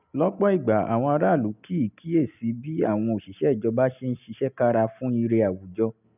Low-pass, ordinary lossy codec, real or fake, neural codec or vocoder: 3.6 kHz; none; real; none